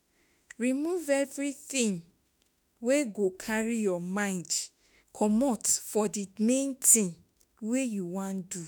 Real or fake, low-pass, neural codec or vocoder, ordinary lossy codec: fake; none; autoencoder, 48 kHz, 32 numbers a frame, DAC-VAE, trained on Japanese speech; none